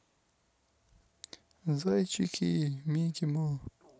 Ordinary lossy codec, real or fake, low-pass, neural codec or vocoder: none; real; none; none